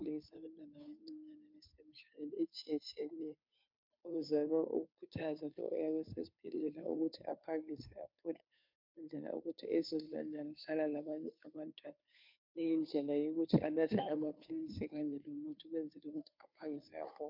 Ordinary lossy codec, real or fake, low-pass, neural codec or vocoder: MP3, 48 kbps; fake; 5.4 kHz; codec, 16 kHz, 2 kbps, FunCodec, trained on Chinese and English, 25 frames a second